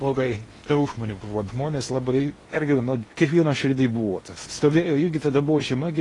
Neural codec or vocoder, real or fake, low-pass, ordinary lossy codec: codec, 16 kHz in and 24 kHz out, 0.8 kbps, FocalCodec, streaming, 65536 codes; fake; 10.8 kHz; AAC, 32 kbps